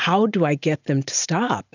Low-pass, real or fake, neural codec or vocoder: 7.2 kHz; real; none